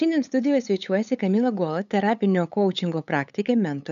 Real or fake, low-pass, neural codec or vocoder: fake; 7.2 kHz; codec, 16 kHz, 8 kbps, FunCodec, trained on LibriTTS, 25 frames a second